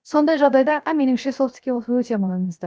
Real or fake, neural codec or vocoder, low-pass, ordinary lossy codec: fake; codec, 16 kHz, 0.7 kbps, FocalCodec; none; none